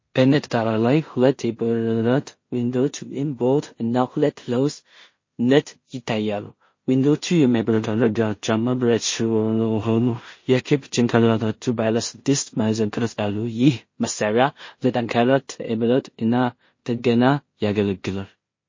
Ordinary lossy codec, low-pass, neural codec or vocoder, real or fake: MP3, 32 kbps; 7.2 kHz; codec, 16 kHz in and 24 kHz out, 0.4 kbps, LongCat-Audio-Codec, two codebook decoder; fake